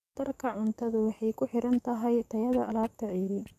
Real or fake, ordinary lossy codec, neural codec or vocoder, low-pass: fake; none; codec, 44.1 kHz, 7.8 kbps, DAC; 14.4 kHz